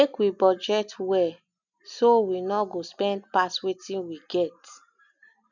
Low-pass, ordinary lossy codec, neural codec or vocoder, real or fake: 7.2 kHz; none; none; real